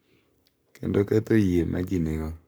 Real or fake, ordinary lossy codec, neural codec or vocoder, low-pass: fake; none; codec, 44.1 kHz, 7.8 kbps, Pupu-Codec; none